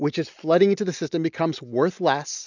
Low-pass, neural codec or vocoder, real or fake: 7.2 kHz; none; real